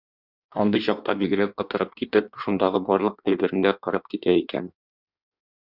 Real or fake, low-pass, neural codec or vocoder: fake; 5.4 kHz; codec, 16 kHz in and 24 kHz out, 1.1 kbps, FireRedTTS-2 codec